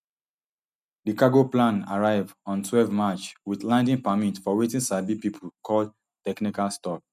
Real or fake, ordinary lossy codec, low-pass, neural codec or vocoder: real; none; 14.4 kHz; none